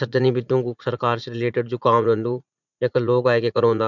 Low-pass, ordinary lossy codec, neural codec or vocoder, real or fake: 7.2 kHz; none; vocoder, 44.1 kHz, 80 mel bands, Vocos; fake